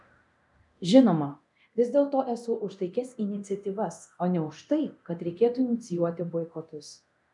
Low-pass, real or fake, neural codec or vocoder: 10.8 kHz; fake; codec, 24 kHz, 0.9 kbps, DualCodec